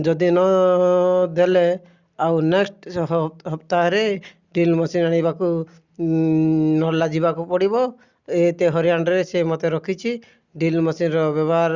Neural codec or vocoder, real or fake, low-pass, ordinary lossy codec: none; real; 7.2 kHz; Opus, 64 kbps